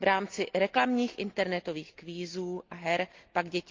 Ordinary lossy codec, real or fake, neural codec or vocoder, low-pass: Opus, 32 kbps; real; none; 7.2 kHz